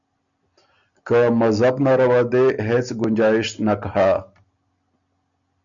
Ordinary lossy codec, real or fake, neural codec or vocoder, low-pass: MP3, 96 kbps; real; none; 7.2 kHz